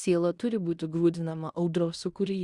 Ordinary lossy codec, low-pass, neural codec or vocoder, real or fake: Opus, 64 kbps; 10.8 kHz; codec, 16 kHz in and 24 kHz out, 0.9 kbps, LongCat-Audio-Codec, fine tuned four codebook decoder; fake